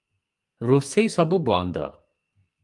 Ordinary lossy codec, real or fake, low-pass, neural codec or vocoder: Opus, 32 kbps; fake; 10.8 kHz; codec, 44.1 kHz, 2.6 kbps, SNAC